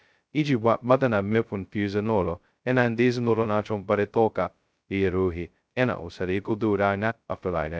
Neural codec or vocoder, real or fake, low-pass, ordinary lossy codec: codec, 16 kHz, 0.2 kbps, FocalCodec; fake; none; none